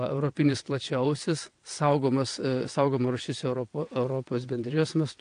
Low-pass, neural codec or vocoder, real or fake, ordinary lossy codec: 9.9 kHz; vocoder, 22.05 kHz, 80 mel bands, WaveNeXt; fake; AAC, 48 kbps